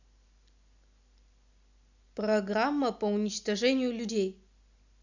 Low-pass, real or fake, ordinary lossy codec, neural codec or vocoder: 7.2 kHz; real; none; none